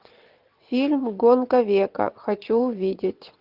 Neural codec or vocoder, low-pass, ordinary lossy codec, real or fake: vocoder, 22.05 kHz, 80 mel bands, WaveNeXt; 5.4 kHz; Opus, 24 kbps; fake